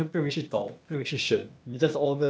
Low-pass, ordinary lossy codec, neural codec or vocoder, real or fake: none; none; codec, 16 kHz, 0.8 kbps, ZipCodec; fake